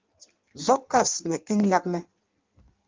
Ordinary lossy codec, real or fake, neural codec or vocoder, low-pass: Opus, 32 kbps; fake; codec, 16 kHz in and 24 kHz out, 1.1 kbps, FireRedTTS-2 codec; 7.2 kHz